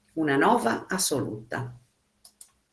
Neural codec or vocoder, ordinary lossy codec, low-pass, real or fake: none; Opus, 16 kbps; 10.8 kHz; real